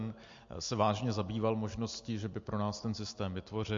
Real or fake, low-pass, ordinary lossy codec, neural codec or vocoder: real; 7.2 kHz; MP3, 48 kbps; none